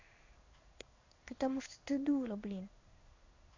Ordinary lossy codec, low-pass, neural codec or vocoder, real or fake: MP3, 64 kbps; 7.2 kHz; codec, 16 kHz in and 24 kHz out, 1 kbps, XY-Tokenizer; fake